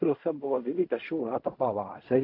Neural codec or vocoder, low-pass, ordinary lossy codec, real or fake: codec, 16 kHz in and 24 kHz out, 0.4 kbps, LongCat-Audio-Codec, fine tuned four codebook decoder; 5.4 kHz; AAC, 32 kbps; fake